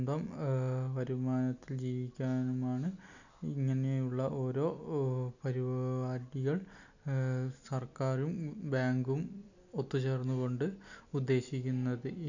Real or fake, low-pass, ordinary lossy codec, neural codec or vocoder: real; 7.2 kHz; none; none